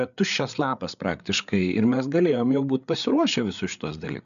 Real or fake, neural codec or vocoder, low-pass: fake; codec, 16 kHz, 8 kbps, FreqCodec, larger model; 7.2 kHz